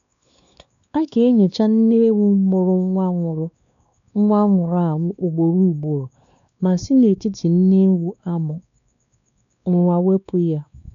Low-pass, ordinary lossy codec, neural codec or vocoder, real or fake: 7.2 kHz; none; codec, 16 kHz, 2 kbps, X-Codec, WavLM features, trained on Multilingual LibriSpeech; fake